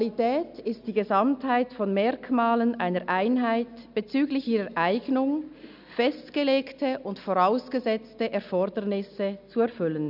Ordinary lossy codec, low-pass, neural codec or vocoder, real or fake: none; 5.4 kHz; none; real